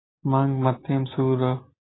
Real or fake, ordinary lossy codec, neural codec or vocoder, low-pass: real; AAC, 16 kbps; none; 7.2 kHz